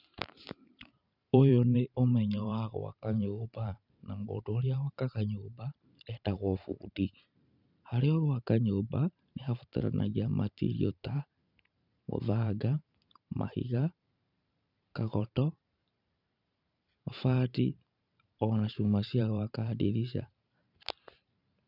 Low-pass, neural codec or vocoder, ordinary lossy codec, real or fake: 5.4 kHz; vocoder, 44.1 kHz, 128 mel bands every 512 samples, BigVGAN v2; none; fake